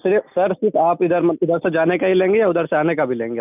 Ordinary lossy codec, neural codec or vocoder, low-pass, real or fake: none; none; 3.6 kHz; real